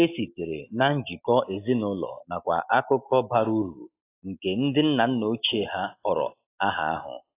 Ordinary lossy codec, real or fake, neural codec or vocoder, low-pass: AAC, 24 kbps; real; none; 3.6 kHz